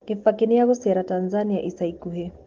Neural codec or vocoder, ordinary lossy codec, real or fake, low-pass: none; Opus, 16 kbps; real; 7.2 kHz